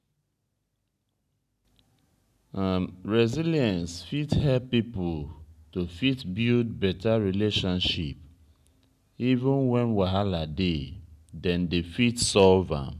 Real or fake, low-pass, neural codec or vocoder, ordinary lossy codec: real; 14.4 kHz; none; none